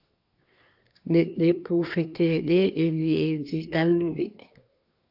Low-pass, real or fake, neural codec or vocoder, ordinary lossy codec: 5.4 kHz; fake; codec, 24 kHz, 1 kbps, SNAC; AAC, 48 kbps